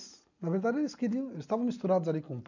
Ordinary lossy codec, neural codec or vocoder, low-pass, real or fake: none; none; 7.2 kHz; real